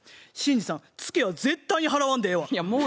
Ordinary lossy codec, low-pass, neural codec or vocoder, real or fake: none; none; none; real